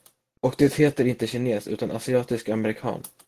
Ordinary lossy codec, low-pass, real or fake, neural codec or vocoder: Opus, 16 kbps; 14.4 kHz; real; none